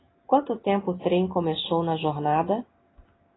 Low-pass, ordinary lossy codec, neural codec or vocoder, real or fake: 7.2 kHz; AAC, 16 kbps; none; real